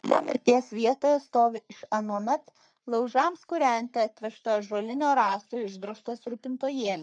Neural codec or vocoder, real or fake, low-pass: codec, 44.1 kHz, 3.4 kbps, Pupu-Codec; fake; 9.9 kHz